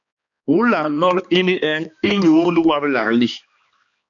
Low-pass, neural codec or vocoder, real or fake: 7.2 kHz; codec, 16 kHz, 2 kbps, X-Codec, HuBERT features, trained on balanced general audio; fake